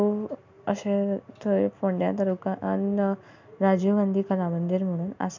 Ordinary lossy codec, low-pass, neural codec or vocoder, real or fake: MP3, 64 kbps; 7.2 kHz; none; real